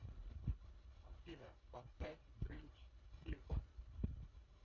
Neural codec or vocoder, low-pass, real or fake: codec, 24 kHz, 1.5 kbps, HILCodec; 7.2 kHz; fake